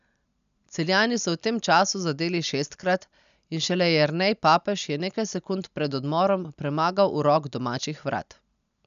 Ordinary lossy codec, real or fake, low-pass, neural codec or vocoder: none; real; 7.2 kHz; none